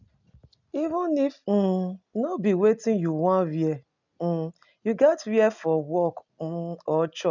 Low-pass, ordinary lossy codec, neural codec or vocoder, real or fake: 7.2 kHz; none; none; real